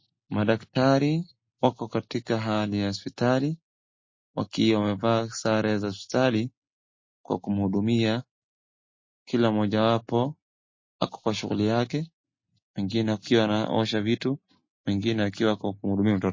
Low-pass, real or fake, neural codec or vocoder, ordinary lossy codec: 7.2 kHz; real; none; MP3, 32 kbps